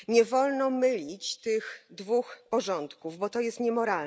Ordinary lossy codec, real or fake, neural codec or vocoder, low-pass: none; real; none; none